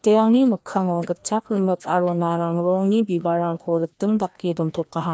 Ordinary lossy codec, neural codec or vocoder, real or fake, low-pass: none; codec, 16 kHz, 1 kbps, FreqCodec, larger model; fake; none